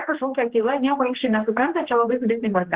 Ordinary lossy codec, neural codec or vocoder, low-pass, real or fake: Opus, 16 kbps; codec, 16 kHz, 2 kbps, X-Codec, HuBERT features, trained on general audio; 3.6 kHz; fake